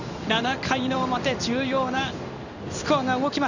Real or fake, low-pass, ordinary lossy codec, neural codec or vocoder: fake; 7.2 kHz; none; codec, 16 kHz in and 24 kHz out, 1 kbps, XY-Tokenizer